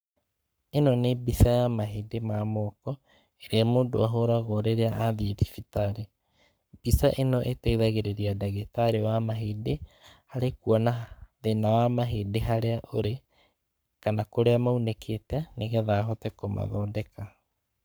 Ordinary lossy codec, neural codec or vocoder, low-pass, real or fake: none; codec, 44.1 kHz, 7.8 kbps, Pupu-Codec; none; fake